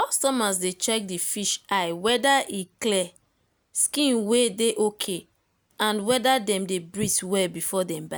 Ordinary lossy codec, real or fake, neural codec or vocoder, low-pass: none; real; none; none